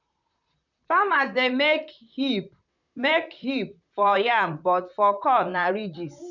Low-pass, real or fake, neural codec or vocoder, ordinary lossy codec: 7.2 kHz; fake; vocoder, 44.1 kHz, 128 mel bands, Pupu-Vocoder; none